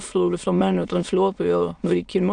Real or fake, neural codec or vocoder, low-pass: fake; autoencoder, 22.05 kHz, a latent of 192 numbers a frame, VITS, trained on many speakers; 9.9 kHz